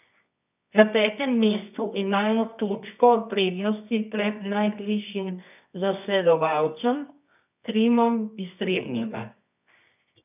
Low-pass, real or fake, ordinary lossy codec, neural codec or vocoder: 3.6 kHz; fake; none; codec, 24 kHz, 0.9 kbps, WavTokenizer, medium music audio release